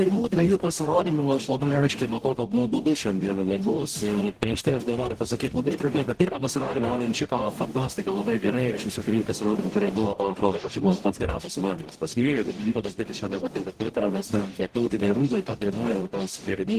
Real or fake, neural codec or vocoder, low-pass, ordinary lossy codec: fake; codec, 44.1 kHz, 0.9 kbps, DAC; 14.4 kHz; Opus, 16 kbps